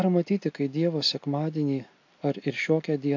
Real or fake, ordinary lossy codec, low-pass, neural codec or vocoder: real; AAC, 48 kbps; 7.2 kHz; none